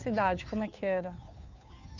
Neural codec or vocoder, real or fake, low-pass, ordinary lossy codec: codec, 16 kHz, 2 kbps, FunCodec, trained on Chinese and English, 25 frames a second; fake; 7.2 kHz; none